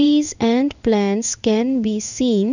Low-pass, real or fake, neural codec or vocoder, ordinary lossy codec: 7.2 kHz; fake; codec, 16 kHz in and 24 kHz out, 1 kbps, XY-Tokenizer; none